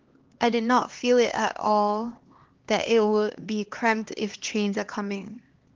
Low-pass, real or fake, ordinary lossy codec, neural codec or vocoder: 7.2 kHz; fake; Opus, 16 kbps; codec, 16 kHz, 2 kbps, X-Codec, HuBERT features, trained on LibriSpeech